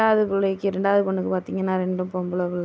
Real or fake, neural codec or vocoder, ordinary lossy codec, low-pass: real; none; none; none